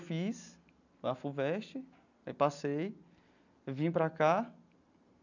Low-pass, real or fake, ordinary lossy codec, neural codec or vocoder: 7.2 kHz; real; none; none